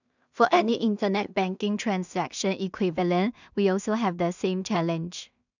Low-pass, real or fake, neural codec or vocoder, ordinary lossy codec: 7.2 kHz; fake; codec, 16 kHz in and 24 kHz out, 0.4 kbps, LongCat-Audio-Codec, two codebook decoder; none